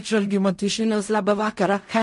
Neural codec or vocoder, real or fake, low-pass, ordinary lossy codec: codec, 16 kHz in and 24 kHz out, 0.4 kbps, LongCat-Audio-Codec, fine tuned four codebook decoder; fake; 10.8 kHz; MP3, 48 kbps